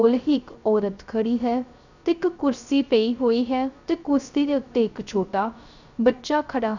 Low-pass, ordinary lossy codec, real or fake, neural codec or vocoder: 7.2 kHz; none; fake; codec, 16 kHz, 0.3 kbps, FocalCodec